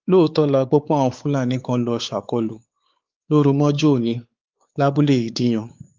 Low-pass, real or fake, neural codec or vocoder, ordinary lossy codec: 7.2 kHz; fake; codec, 16 kHz, 4 kbps, X-Codec, WavLM features, trained on Multilingual LibriSpeech; Opus, 32 kbps